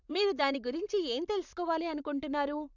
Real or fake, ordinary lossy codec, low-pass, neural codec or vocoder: fake; none; 7.2 kHz; vocoder, 44.1 kHz, 128 mel bands, Pupu-Vocoder